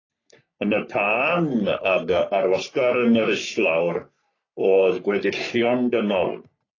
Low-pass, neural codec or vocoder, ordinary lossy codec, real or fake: 7.2 kHz; codec, 44.1 kHz, 3.4 kbps, Pupu-Codec; AAC, 32 kbps; fake